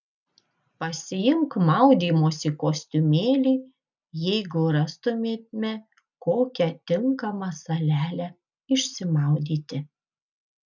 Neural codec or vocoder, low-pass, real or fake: none; 7.2 kHz; real